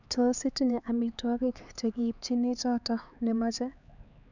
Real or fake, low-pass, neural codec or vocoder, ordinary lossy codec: fake; 7.2 kHz; codec, 16 kHz, 4 kbps, X-Codec, HuBERT features, trained on LibriSpeech; none